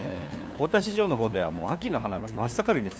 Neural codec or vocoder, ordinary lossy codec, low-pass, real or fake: codec, 16 kHz, 2 kbps, FunCodec, trained on LibriTTS, 25 frames a second; none; none; fake